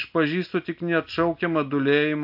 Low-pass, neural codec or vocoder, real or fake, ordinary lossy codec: 5.4 kHz; none; real; AAC, 48 kbps